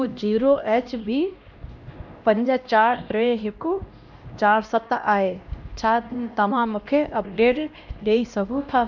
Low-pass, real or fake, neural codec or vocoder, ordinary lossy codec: 7.2 kHz; fake; codec, 16 kHz, 1 kbps, X-Codec, HuBERT features, trained on LibriSpeech; none